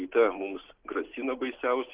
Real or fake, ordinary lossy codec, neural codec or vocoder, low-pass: real; Opus, 16 kbps; none; 3.6 kHz